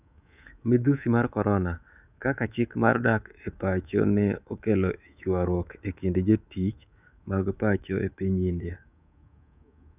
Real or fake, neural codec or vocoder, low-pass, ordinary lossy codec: fake; codec, 24 kHz, 3.1 kbps, DualCodec; 3.6 kHz; none